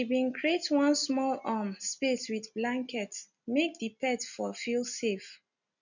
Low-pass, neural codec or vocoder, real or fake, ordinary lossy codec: 7.2 kHz; none; real; none